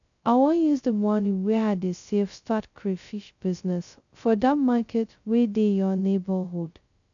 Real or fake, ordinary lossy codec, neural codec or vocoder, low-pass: fake; none; codec, 16 kHz, 0.2 kbps, FocalCodec; 7.2 kHz